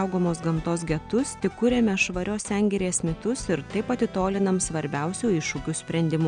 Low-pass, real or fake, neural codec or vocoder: 9.9 kHz; real; none